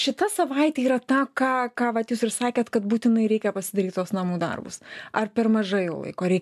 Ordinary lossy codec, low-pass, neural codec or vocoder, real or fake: AAC, 96 kbps; 14.4 kHz; none; real